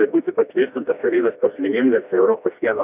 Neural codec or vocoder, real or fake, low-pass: codec, 16 kHz, 1 kbps, FreqCodec, smaller model; fake; 3.6 kHz